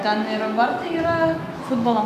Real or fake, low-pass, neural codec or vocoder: fake; 14.4 kHz; autoencoder, 48 kHz, 128 numbers a frame, DAC-VAE, trained on Japanese speech